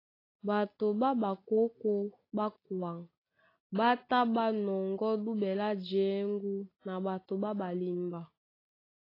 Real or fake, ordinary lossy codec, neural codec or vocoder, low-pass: real; AAC, 24 kbps; none; 5.4 kHz